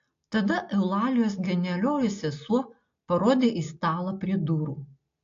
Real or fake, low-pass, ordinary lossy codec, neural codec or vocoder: real; 7.2 kHz; AAC, 48 kbps; none